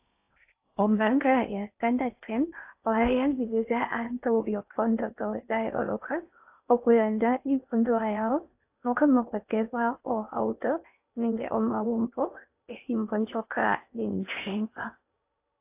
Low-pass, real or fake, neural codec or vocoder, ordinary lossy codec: 3.6 kHz; fake; codec, 16 kHz in and 24 kHz out, 0.6 kbps, FocalCodec, streaming, 4096 codes; AAC, 32 kbps